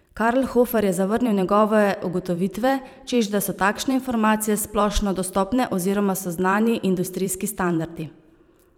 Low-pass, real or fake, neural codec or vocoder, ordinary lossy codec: 19.8 kHz; fake; vocoder, 44.1 kHz, 128 mel bands every 512 samples, BigVGAN v2; none